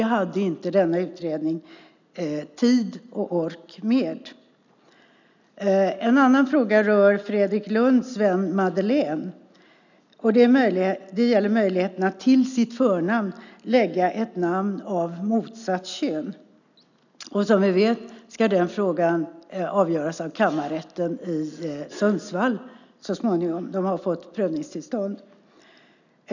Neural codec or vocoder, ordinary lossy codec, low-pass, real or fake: none; none; 7.2 kHz; real